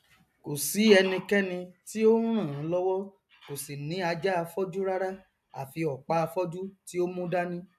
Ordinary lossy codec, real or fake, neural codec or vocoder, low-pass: none; real; none; 14.4 kHz